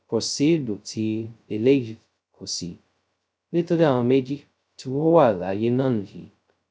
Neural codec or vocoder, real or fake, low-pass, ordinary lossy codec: codec, 16 kHz, 0.2 kbps, FocalCodec; fake; none; none